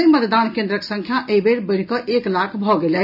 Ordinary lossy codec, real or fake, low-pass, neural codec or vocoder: none; real; 5.4 kHz; none